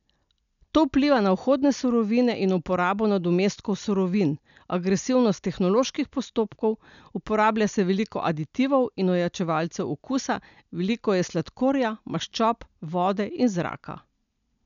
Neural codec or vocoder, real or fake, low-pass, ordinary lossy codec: none; real; 7.2 kHz; MP3, 96 kbps